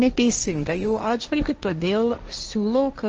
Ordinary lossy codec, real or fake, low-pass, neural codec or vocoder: Opus, 24 kbps; fake; 7.2 kHz; codec, 16 kHz, 1.1 kbps, Voila-Tokenizer